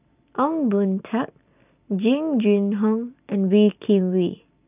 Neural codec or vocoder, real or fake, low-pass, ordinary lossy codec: none; real; 3.6 kHz; none